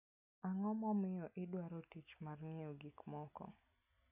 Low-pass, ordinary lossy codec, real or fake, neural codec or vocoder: 3.6 kHz; none; real; none